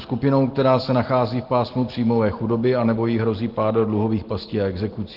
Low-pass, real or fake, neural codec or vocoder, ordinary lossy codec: 5.4 kHz; real; none; Opus, 16 kbps